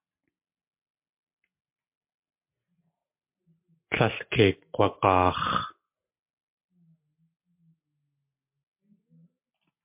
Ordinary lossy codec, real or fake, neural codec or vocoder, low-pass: MP3, 32 kbps; real; none; 3.6 kHz